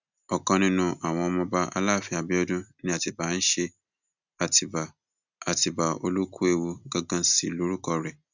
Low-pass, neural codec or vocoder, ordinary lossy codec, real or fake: 7.2 kHz; none; none; real